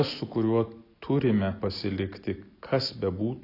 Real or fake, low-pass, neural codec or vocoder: real; 5.4 kHz; none